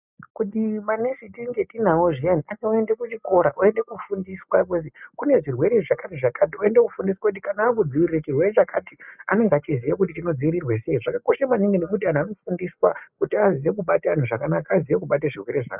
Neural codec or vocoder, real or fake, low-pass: none; real; 3.6 kHz